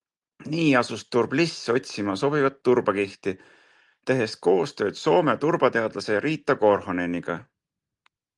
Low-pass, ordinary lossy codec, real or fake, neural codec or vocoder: 10.8 kHz; Opus, 24 kbps; real; none